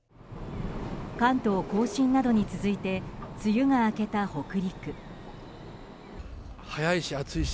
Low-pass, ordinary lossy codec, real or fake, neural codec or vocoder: none; none; real; none